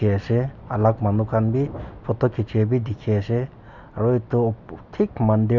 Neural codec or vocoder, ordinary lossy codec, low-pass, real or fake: none; none; 7.2 kHz; real